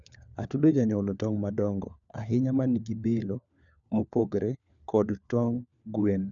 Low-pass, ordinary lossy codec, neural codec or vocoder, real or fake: 7.2 kHz; none; codec, 16 kHz, 4 kbps, FunCodec, trained on LibriTTS, 50 frames a second; fake